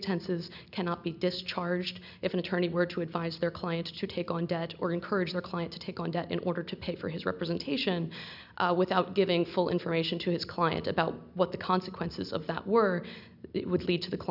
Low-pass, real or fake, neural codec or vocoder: 5.4 kHz; real; none